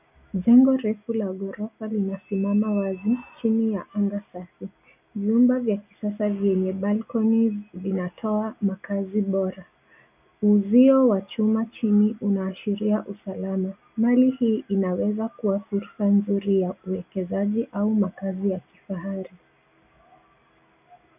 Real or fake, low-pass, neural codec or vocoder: real; 3.6 kHz; none